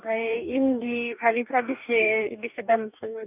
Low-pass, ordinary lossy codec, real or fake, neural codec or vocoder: 3.6 kHz; none; fake; codec, 44.1 kHz, 2.6 kbps, DAC